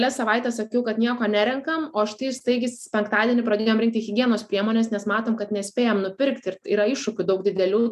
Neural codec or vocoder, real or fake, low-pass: none; real; 14.4 kHz